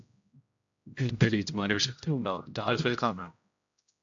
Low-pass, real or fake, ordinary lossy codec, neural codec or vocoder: 7.2 kHz; fake; AAC, 64 kbps; codec, 16 kHz, 0.5 kbps, X-Codec, HuBERT features, trained on general audio